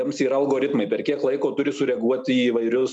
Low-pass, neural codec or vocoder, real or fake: 10.8 kHz; none; real